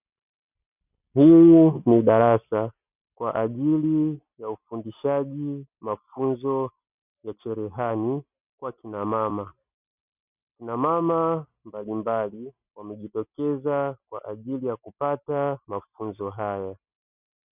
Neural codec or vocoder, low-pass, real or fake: none; 3.6 kHz; real